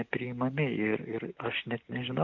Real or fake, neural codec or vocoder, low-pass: real; none; 7.2 kHz